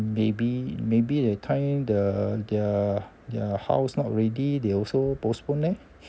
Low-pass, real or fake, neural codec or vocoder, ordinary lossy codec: none; real; none; none